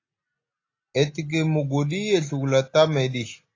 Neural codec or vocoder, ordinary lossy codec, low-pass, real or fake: none; AAC, 32 kbps; 7.2 kHz; real